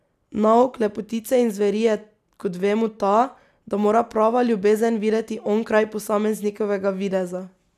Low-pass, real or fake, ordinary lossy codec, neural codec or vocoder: 14.4 kHz; real; none; none